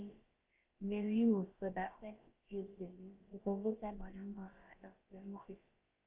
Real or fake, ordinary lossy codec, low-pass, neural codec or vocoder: fake; Opus, 32 kbps; 3.6 kHz; codec, 16 kHz, about 1 kbps, DyCAST, with the encoder's durations